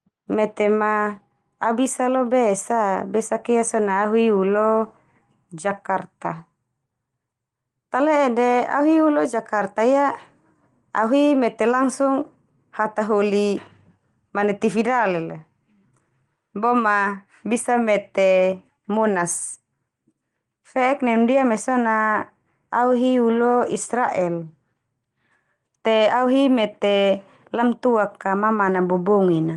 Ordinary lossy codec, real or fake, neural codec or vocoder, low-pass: Opus, 24 kbps; real; none; 10.8 kHz